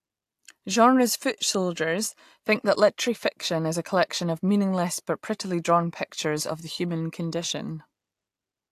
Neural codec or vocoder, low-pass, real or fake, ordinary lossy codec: none; 14.4 kHz; real; AAC, 64 kbps